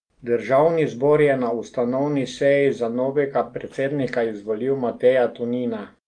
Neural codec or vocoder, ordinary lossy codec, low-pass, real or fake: none; Opus, 32 kbps; 9.9 kHz; real